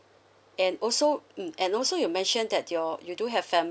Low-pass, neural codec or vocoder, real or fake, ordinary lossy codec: none; none; real; none